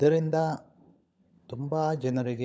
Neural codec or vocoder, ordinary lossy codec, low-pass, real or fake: codec, 16 kHz, 16 kbps, FunCodec, trained on LibriTTS, 50 frames a second; none; none; fake